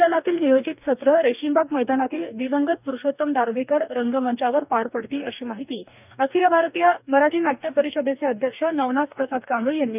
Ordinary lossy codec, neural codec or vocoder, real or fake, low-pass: none; codec, 44.1 kHz, 2.6 kbps, DAC; fake; 3.6 kHz